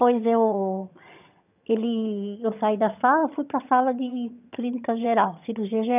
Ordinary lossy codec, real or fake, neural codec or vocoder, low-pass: none; fake; vocoder, 22.05 kHz, 80 mel bands, HiFi-GAN; 3.6 kHz